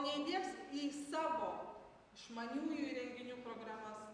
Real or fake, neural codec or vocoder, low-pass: real; none; 9.9 kHz